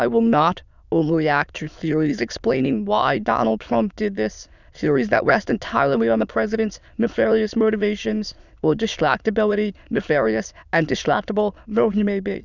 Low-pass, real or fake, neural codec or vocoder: 7.2 kHz; fake; autoencoder, 22.05 kHz, a latent of 192 numbers a frame, VITS, trained on many speakers